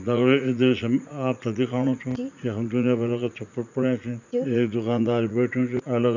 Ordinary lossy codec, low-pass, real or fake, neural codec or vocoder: none; 7.2 kHz; fake; vocoder, 44.1 kHz, 80 mel bands, Vocos